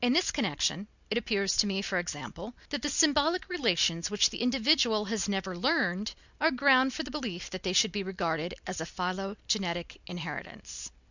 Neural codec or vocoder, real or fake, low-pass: none; real; 7.2 kHz